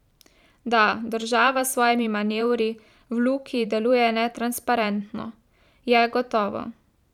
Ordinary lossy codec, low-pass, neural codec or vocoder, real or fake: none; 19.8 kHz; vocoder, 44.1 kHz, 128 mel bands every 512 samples, BigVGAN v2; fake